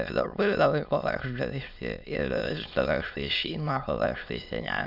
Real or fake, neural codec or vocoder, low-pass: fake; autoencoder, 22.05 kHz, a latent of 192 numbers a frame, VITS, trained on many speakers; 5.4 kHz